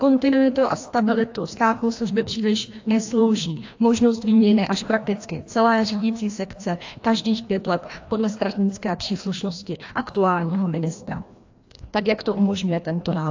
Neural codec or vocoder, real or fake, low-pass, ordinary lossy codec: codec, 16 kHz, 1 kbps, FreqCodec, larger model; fake; 7.2 kHz; AAC, 48 kbps